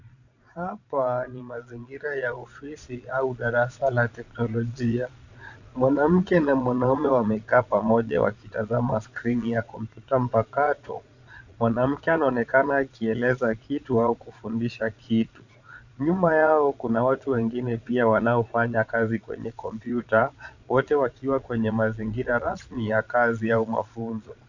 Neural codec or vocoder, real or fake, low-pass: vocoder, 22.05 kHz, 80 mel bands, Vocos; fake; 7.2 kHz